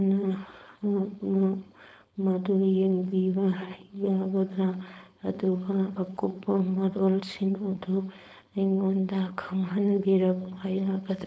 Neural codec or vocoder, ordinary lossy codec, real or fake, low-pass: codec, 16 kHz, 4.8 kbps, FACodec; none; fake; none